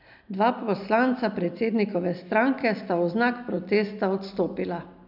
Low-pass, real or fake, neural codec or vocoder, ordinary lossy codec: 5.4 kHz; real; none; none